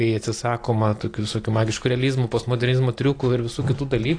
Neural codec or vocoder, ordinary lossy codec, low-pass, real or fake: none; AAC, 48 kbps; 9.9 kHz; real